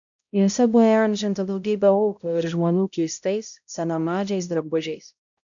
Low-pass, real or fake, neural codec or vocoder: 7.2 kHz; fake; codec, 16 kHz, 0.5 kbps, X-Codec, HuBERT features, trained on balanced general audio